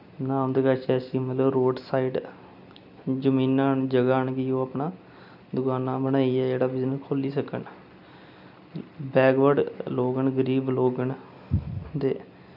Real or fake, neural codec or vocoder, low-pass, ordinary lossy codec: real; none; 5.4 kHz; none